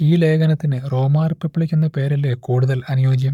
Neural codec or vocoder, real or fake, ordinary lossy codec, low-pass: codec, 44.1 kHz, 7.8 kbps, Pupu-Codec; fake; none; 19.8 kHz